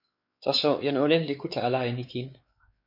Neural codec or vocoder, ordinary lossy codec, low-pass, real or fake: codec, 16 kHz, 4 kbps, X-Codec, WavLM features, trained on Multilingual LibriSpeech; MP3, 32 kbps; 5.4 kHz; fake